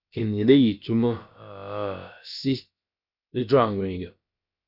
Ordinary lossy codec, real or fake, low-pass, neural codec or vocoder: Opus, 64 kbps; fake; 5.4 kHz; codec, 16 kHz, about 1 kbps, DyCAST, with the encoder's durations